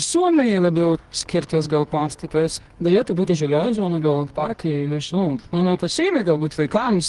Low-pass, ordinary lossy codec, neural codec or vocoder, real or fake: 10.8 kHz; Opus, 24 kbps; codec, 24 kHz, 0.9 kbps, WavTokenizer, medium music audio release; fake